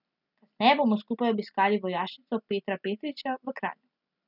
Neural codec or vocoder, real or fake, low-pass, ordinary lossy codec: none; real; 5.4 kHz; none